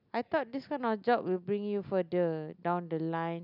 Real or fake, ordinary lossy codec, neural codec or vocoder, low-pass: real; none; none; 5.4 kHz